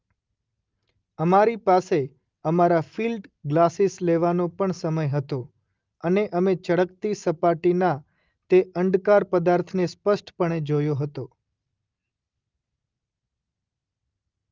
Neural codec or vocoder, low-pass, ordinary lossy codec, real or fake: none; 7.2 kHz; Opus, 24 kbps; real